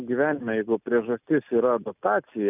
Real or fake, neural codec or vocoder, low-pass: real; none; 3.6 kHz